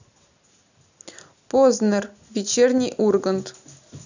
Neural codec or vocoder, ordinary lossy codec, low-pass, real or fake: none; none; 7.2 kHz; real